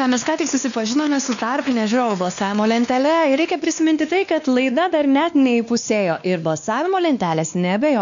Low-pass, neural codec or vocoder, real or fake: 7.2 kHz; codec, 16 kHz, 2 kbps, X-Codec, WavLM features, trained on Multilingual LibriSpeech; fake